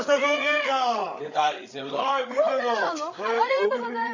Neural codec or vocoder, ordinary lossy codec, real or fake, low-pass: codec, 16 kHz, 8 kbps, FreqCodec, larger model; none; fake; 7.2 kHz